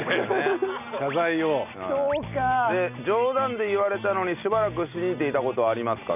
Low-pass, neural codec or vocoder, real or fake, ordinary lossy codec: 3.6 kHz; none; real; none